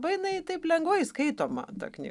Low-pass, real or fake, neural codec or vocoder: 10.8 kHz; real; none